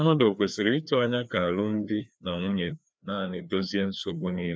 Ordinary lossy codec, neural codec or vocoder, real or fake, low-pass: none; codec, 16 kHz, 2 kbps, FreqCodec, larger model; fake; none